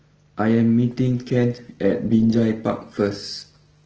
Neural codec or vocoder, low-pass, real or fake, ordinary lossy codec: none; 7.2 kHz; real; Opus, 16 kbps